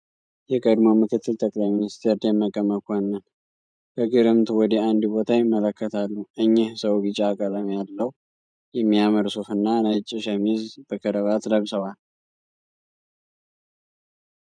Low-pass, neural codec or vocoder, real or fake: 9.9 kHz; vocoder, 44.1 kHz, 128 mel bands every 512 samples, BigVGAN v2; fake